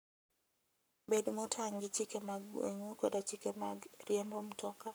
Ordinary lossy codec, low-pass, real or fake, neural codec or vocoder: none; none; fake; codec, 44.1 kHz, 7.8 kbps, Pupu-Codec